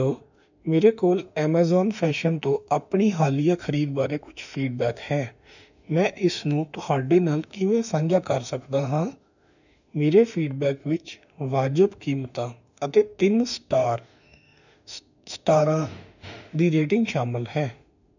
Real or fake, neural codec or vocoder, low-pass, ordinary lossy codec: fake; autoencoder, 48 kHz, 32 numbers a frame, DAC-VAE, trained on Japanese speech; 7.2 kHz; none